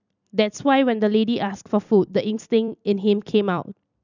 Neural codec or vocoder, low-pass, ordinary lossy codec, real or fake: vocoder, 44.1 kHz, 128 mel bands every 256 samples, BigVGAN v2; 7.2 kHz; none; fake